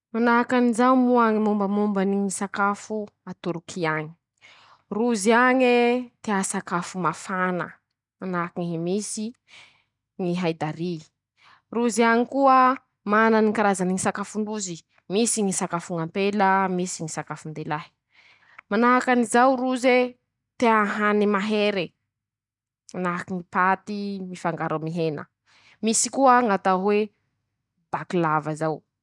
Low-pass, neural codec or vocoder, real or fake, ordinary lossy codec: 10.8 kHz; none; real; none